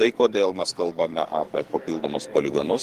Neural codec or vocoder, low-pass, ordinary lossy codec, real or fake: codec, 44.1 kHz, 2.6 kbps, SNAC; 14.4 kHz; Opus, 24 kbps; fake